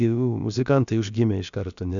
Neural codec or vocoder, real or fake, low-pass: codec, 16 kHz, about 1 kbps, DyCAST, with the encoder's durations; fake; 7.2 kHz